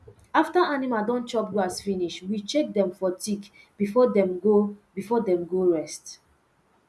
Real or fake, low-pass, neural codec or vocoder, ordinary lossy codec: real; none; none; none